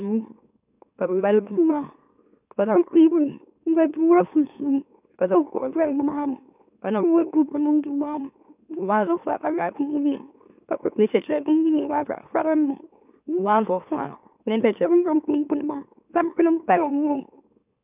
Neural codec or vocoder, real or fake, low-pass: autoencoder, 44.1 kHz, a latent of 192 numbers a frame, MeloTTS; fake; 3.6 kHz